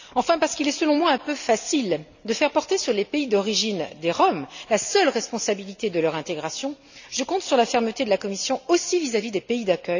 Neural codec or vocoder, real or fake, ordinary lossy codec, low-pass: none; real; none; 7.2 kHz